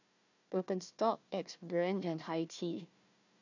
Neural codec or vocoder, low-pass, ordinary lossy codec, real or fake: codec, 16 kHz, 1 kbps, FunCodec, trained on Chinese and English, 50 frames a second; 7.2 kHz; none; fake